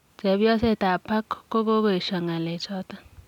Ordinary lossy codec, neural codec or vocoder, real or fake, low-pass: none; none; real; 19.8 kHz